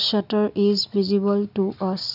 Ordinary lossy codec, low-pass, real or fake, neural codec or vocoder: none; 5.4 kHz; real; none